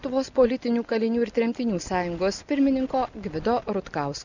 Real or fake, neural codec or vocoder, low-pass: real; none; 7.2 kHz